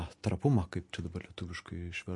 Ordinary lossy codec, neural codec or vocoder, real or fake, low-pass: MP3, 48 kbps; none; real; 10.8 kHz